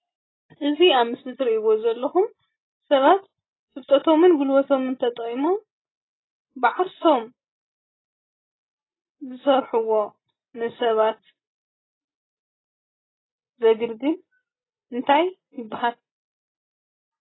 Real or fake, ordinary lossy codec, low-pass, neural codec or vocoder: real; AAC, 16 kbps; 7.2 kHz; none